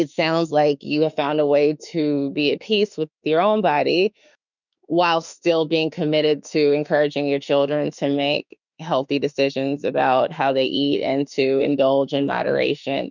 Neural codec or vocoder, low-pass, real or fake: autoencoder, 48 kHz, 32 numbers a frame, DAC-VAE, trained on Japanese speech; 7.2 kHz; fake